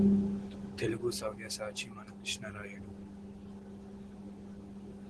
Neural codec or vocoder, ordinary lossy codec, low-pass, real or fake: none; Opus, 16 kbps; 10.8 kHz; real